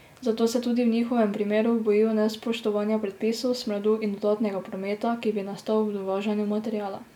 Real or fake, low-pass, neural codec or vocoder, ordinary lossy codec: real; 19.8 kHz; none; none